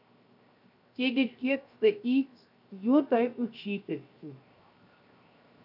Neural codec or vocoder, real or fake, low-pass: codec, 16 kHz, 0.7 kbps, FocalCodec; fake; 5.4 kHz